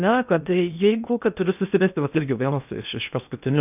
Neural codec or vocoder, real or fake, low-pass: codec, 16 kHz in and 24 kHz out, 0.6 kbps, FocalCodec, streaming, 2048 codes; fake; 3.6 kHz